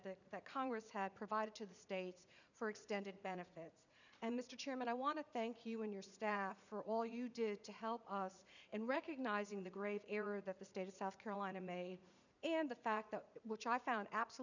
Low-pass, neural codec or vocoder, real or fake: 7.2 kHz; vocoder, 44.1 kHz, 80 mel bands, Vocos; fake